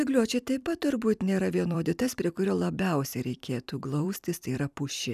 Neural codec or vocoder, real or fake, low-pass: vocoder, 44.1 kHz, 128 mel bands every 256 samples, BigVGAN v2; fake; 14.4 kHz